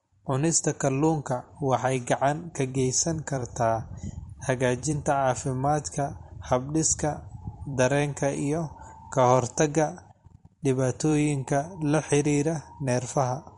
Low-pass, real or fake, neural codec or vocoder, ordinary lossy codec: 19.8 kHz; fake; vocoder, 44.1 kHz, 128 mel bands every 256 samples, BigVGAN v2; MP3, 48 kbps